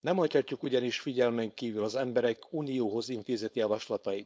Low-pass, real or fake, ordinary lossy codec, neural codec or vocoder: none; fake; none; codec, 16 kHz, 4.8 kbps, FACodec